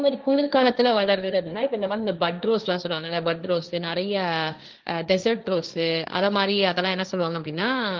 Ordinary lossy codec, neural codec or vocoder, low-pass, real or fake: Opus, 24 kbps; codec, 16 kHz, 1.1 kbps, Voila-Tokenizer; 7.2 kHz; fake